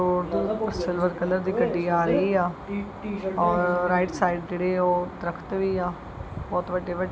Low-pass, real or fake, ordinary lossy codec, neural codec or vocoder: none; real; none; none